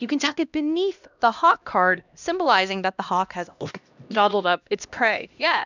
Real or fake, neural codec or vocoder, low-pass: fake; codec, 16 kHz, 1 kbps, X-Codec, HuBERT features, trained on LibriSpeech; 7.2 kHz